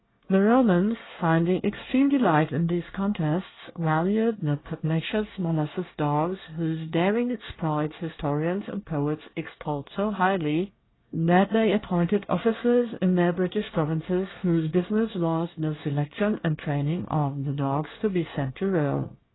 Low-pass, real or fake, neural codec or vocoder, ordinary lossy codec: 7.2 kHz; fake; codec, 24 kHz, 1 kbps, SNAC; AAC, 16 kbps